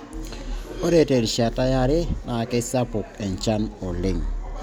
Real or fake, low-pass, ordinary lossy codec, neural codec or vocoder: real; none; none; none